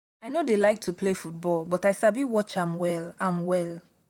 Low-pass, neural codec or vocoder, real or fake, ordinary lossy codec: 19.8 kHz; vocoder, 44.1 kHz, 128 mel bands, Pupu-Vocoder; fake; none